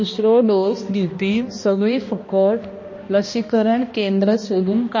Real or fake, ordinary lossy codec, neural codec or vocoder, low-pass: fake; MP3, 32 kbps; codec, 16 kHz, 1 kbps, X-Codec, HuBERT features, trained on balanced general audio; 7.2 kHz